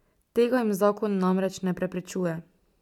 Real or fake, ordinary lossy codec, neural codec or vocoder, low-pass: real; none; none; 19.8 kHz